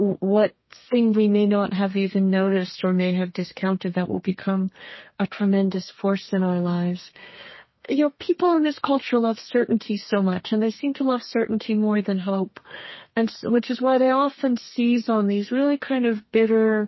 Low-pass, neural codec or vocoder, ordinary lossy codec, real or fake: 7.2 kHz; codec, 32 kHz, 1.9 kbps, SNAC; MP3, 24 kbps; fake